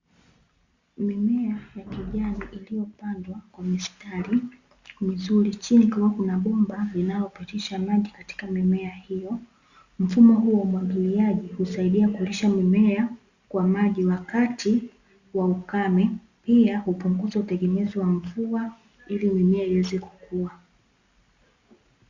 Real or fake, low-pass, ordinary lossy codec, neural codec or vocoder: real; 7.2 kHz; Opus, 64 kbps; none